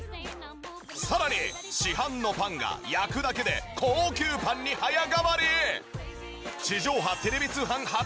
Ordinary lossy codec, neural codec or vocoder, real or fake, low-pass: none; none; real; none